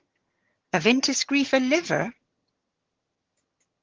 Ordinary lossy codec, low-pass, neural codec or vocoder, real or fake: Opus, 16 kbps; 7.2 kHz; vocoder, 22.05 kHz, 80 mel bands, HiFi-GAN; fake